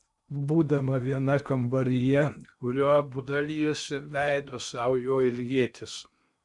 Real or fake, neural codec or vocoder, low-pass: fake; codec, 16 kHz in and 24 kHz out, 0.8 kbps, FocalCodec, streaming, 65536 codes; 10.8 kHz